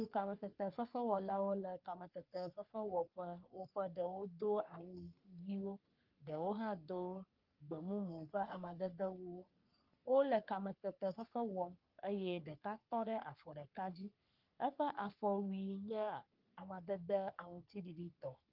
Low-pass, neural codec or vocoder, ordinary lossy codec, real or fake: 5.4 kHz; codec, 44.1 kHz, 3.4 kbps, Pupu-Codec; Opus, 24 kbps; fake